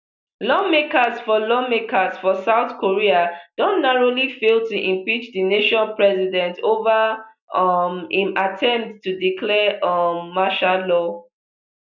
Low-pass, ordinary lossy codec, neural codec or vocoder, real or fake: 7.2 kHz; none; none; real